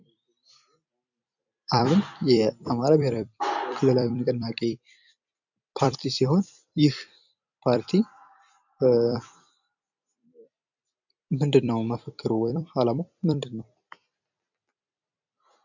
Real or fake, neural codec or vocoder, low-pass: fake; vocoder, 44.1 kHz, 128 mel bands every 256 samples, BigVGAN v2; 7.2 kHz